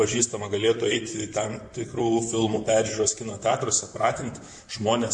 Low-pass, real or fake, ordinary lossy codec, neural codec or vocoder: 10.8 kHz; fake; MP3, 48 kbps; vocoder, 44.1 kHz, 128 mel bands, Pupu-Vocoder